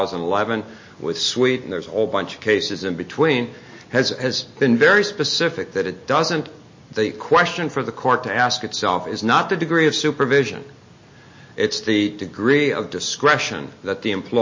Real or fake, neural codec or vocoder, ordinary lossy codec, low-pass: real; none; MP3, 32 kbps; 7.2 kHz